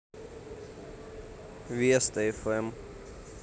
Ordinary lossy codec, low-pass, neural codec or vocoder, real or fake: none; none; none; real